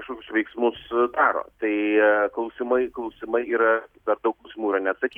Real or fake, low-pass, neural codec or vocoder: real; 19.8 kHz; none